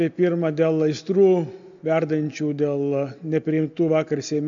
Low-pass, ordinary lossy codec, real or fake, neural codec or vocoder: 7.2 kHz; AAC, 64 kbps; real; none